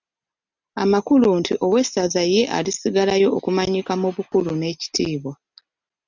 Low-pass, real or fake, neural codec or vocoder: 7.2 kHz; real; none